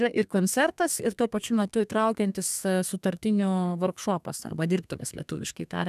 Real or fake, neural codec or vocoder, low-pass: fake; codec, 32 kHz, 1.9 kbps, SNAC; 14.4 kHz